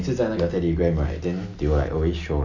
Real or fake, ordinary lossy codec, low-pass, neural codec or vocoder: real; MP3, 64 kbps; 7.2 kHz; none